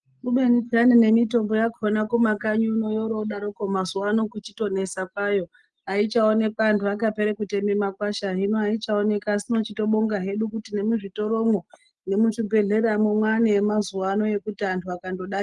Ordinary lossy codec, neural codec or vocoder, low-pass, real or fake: Opus, 24 kbps; none; 10.8 kHz; real